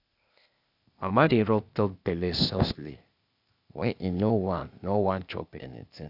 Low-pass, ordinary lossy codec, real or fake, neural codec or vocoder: 5.4 kHz; none; fake; codec, 16 kHz, 0.8 kbps, ZipCodec